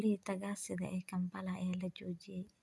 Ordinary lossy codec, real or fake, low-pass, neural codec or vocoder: none; real; none; none